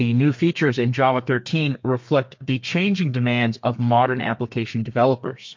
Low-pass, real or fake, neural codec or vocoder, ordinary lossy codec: 7.2 kHz; fake; codec, 32 kHz, 1.9 kbps, SNAC; MP3, 48 kbps